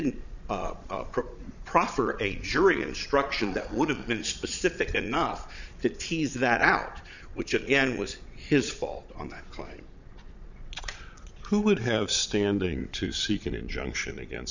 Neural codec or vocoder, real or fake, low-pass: vocoder, 22.05 kHz, 80 mel bands, Vocos; fake; 7.2 kHz